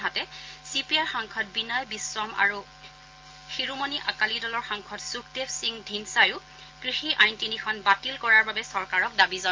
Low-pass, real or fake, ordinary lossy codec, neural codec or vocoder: 7.2 kHz; real; Opus, 32 kbps; none